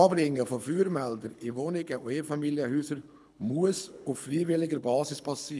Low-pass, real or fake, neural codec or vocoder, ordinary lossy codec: none; fake; codec, 24 kHz, 6 kbps, HILCodec; none